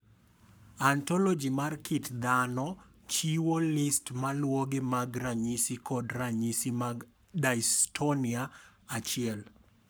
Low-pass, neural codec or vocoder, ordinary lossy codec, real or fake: none; codec, 44.1 kHz, 7.8 kbps, Pupu-Codec; none; fake